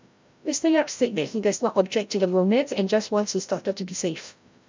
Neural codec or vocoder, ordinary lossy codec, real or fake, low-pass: codec, 16 kHz, 0.5 kbps, FreqCodec, larger model; MP3, 64 kbps; fake; 7.2 kHz